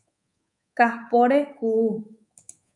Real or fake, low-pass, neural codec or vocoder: fake; 10.8 kHz; codec, 24 kHz, 3.1 kbps, DualCodec